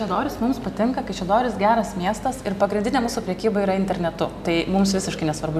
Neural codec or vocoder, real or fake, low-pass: none; real; 14.4 kHz